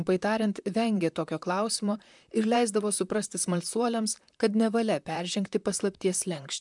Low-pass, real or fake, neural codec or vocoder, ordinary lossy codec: 10.8 kHz; fake; vocoder, 44.1 kHz, 128 mel bands, Pupu-Vocoder; MP3, 96 kbps